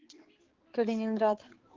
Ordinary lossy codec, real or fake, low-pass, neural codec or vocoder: Opus, 16 kbps; fake; 7.2 kHz; codec, 16 kHz, 4 kbps, X-Codec, HuBERT features, trained on balanced general audio